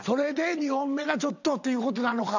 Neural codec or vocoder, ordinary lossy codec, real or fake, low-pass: vocoder, 22.05 kHz, 80 mel bands, WaveNeXt; none; fake; 7.2 kHz